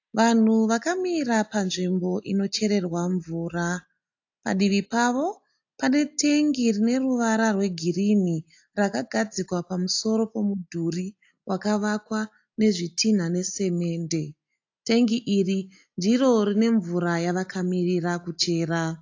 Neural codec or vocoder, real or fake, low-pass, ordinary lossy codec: none; real; 7.2 kHz; AAC, 48 kbps